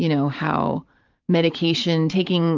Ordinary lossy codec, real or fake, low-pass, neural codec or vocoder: Opus, 24 kbps; fake; 7.2 kHz; vocoder, 44.1 kHz, 128 mel bands every 512 samples, BigVGAN v2